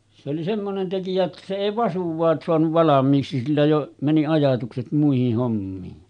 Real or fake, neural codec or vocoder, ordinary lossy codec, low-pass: real; none; none; 9.9 kHz